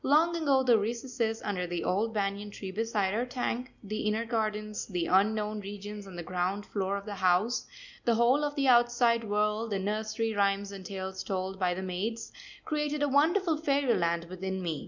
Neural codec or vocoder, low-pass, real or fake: none; 7.2 kHz; real